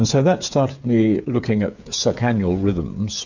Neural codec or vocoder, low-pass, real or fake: codec, 16 kHz, 8 kbps, FreqCodec, smaller model; 7.2 kHz; fake